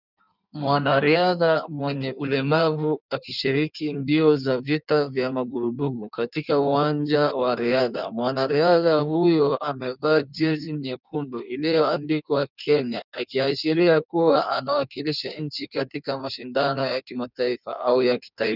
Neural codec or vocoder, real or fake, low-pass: codec, 16 kHz in and 24 kHz out, 1.1 kbps, FireRedTTS-2 codec; fake; 5.4 kHz